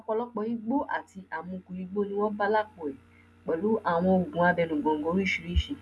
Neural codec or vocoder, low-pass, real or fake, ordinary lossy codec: none; none; real; none